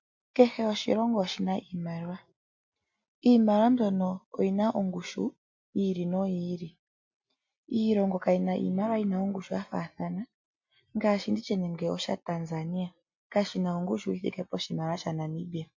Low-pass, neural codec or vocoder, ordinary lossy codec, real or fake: 7.2 kHz; none; MP3, 48 kbps; real